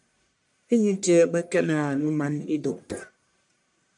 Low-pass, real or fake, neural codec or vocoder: 10.8 kHz; fake; codec, 44.1 kHz, 1.7 kbps, Pupu-Codec